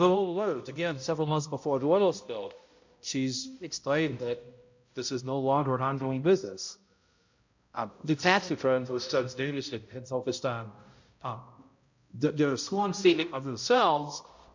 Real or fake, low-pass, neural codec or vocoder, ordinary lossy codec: fake; 7.2 kHz; codec, 16 kHz, 0.5 kbps, X-Codec, HuBERT features, trained on balanced general audio; MP3, 48 kbps